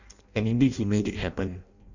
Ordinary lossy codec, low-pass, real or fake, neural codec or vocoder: none; 7.2 kHz; fake; codec, 16 kHz in and 24 kHz out, 0.6 kbps, FireRedTTS-2 codec